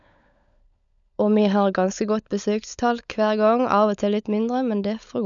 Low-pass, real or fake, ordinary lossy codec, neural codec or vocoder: 7.2 kHz; fake; none; codec, 16 kHz, 16 kbps, FunCodec, trained on LibriTTS, 50 frames a second